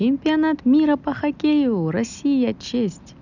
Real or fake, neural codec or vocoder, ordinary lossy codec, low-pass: real; none; none; 7.2 kHz